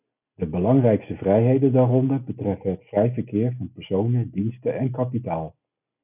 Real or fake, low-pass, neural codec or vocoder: real; 3.6 kHz; none